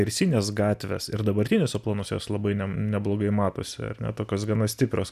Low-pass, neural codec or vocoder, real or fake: 14.4 kHz; none; real